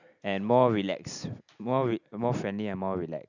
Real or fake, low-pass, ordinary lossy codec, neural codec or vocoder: real; 7.2 kHz; none; none